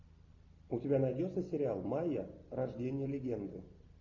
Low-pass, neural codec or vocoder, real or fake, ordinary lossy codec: 7.2 kHz; none; real; AAC, 48 kbps